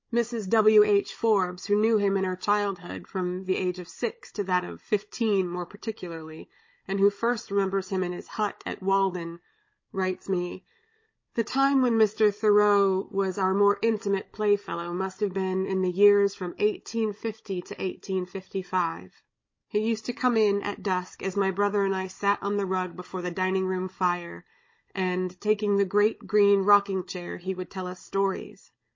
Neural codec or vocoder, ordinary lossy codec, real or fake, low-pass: codec, 16 kHz, 16 kbps, FunCodec, trained on Chinese and English, 50 frames a second; MP3, 32 kbps; fake; 7.2 kHz